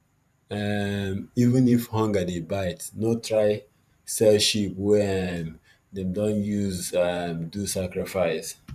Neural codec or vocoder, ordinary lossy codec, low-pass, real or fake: vocoder, 44.1 kHz, 128 mel bands every 512 samples, BigVGAN v2; none; 14.4 kHz; fake